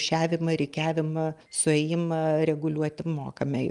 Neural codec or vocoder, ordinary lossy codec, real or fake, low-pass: none; Opus, 32 kbps; real; 10.8 kHz